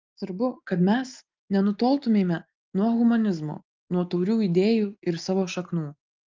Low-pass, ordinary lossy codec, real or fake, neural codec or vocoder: 7.2 kHz; Opus, 32 kbps; real; none